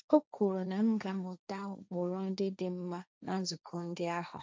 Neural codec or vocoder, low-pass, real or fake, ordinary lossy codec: codec, 16 kHz, 1.1 kbps, Voila-Tokenizer; none; fake; none